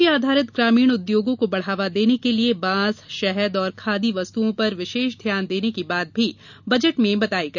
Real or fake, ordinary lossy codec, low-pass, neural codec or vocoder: real; none; 7.2 kHz; none